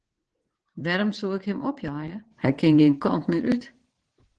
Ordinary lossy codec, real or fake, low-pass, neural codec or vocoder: Opus, 16 kbps; fake; 9.9 kHz; vocoder, 22.05 kHz, 80 mel bands, WaveNeXt